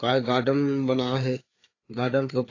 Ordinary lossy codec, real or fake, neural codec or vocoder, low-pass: AAC, 32 kbps; fake; codec, 16 kHz, 4 kbps, FreqCodec, larger model; 7.2 kHz